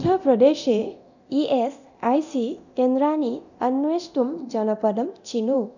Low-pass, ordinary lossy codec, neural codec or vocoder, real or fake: 7.2 kHz; none; codec, 24 kHz, 0.9 kbps, DualCodec; fake